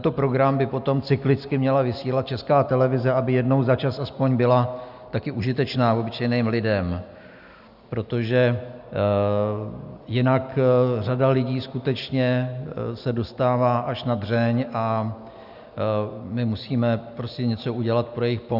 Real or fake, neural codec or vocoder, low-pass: real; none; 5.4 kHz